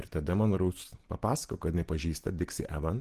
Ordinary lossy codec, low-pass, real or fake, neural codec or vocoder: Opus, 16 kbps; 14.4 kHz; real; none